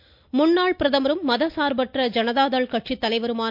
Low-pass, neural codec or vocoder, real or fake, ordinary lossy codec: 5.4 kHz; none; real; MP3, 48 kbps